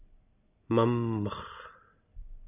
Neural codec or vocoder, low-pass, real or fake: none; 3.6 kHz; real